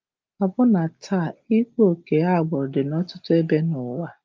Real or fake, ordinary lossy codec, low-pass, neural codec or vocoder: real; Opus, 24 kbps; 7.2 kHz; none